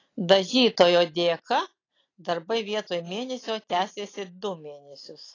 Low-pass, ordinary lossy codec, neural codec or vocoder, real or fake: 7.2 kHz; AAC, 32 kbps; none; real